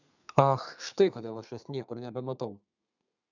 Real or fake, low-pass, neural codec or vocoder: fake; 7.2 kHz; codec, 32 kHz, 1.9 kbps, SNAC